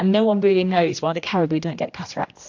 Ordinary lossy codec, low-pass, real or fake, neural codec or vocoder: AAC, 48 kbps; 7.2 kHz; fake; codec, 16 kHz, 1 kbps, X-Codec, HuBERT features, trained on general audio